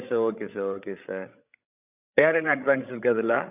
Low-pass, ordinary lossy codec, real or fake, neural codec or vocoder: 3.6 kHz; none; fake; codec, 16 kHz, 16 kbps, FreqCodec, larger model